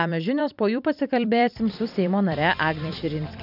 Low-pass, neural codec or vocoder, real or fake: 5.4 kHz; vocoder, 44.1 kHz, 128 mel bands every 256 samples, BigVGAN v2; fake